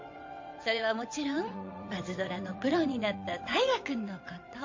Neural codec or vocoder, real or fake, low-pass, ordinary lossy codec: vocoder, 22.05 kHz, 80 mel bands, WaveNeXt; fake; 7.2 kHz; MP3, 64 kbps